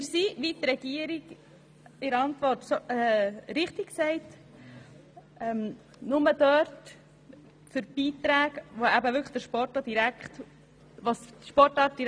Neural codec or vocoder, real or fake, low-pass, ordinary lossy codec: none; real; none; none